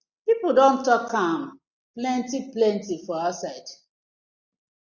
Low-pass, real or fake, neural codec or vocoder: 7.2 kHz; real; none